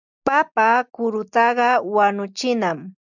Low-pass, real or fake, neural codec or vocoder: 7.2 kHz; real; none